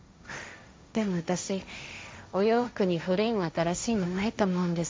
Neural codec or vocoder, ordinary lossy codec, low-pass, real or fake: codec, 16 kHz, 1.1 kbps, Voila-Tokenizer; none; none; fake